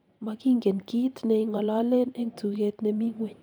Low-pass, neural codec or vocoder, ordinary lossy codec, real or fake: none; vocoder, 44.1 kHz, 128 mel bands every 512 samples, BigVGAN v2; none; fake